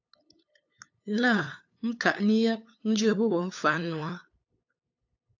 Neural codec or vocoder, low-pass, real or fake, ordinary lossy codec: codec, 16 kHz, 8 kbps, FunCodec, trained on LibriTTS, 25 frames a second; 7.2 kHz; fake; AAC, 48 kbps